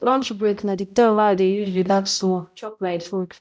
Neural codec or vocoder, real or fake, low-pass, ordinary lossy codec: codec, 16 kHz, 0.5 kbps, X-Codec, HuBERT features, trained on balanced general audio; fake; none; none